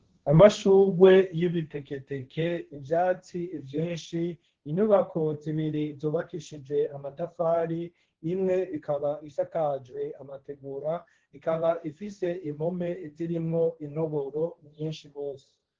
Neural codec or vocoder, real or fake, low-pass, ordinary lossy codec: codec, 16 kHz, 1.1 kbps, Voila-Tokenizer; fake; 7.2 kHz; Opus, 16 kbps